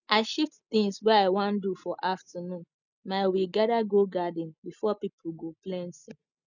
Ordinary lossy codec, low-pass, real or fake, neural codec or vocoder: none; 7.2 kHz; real; none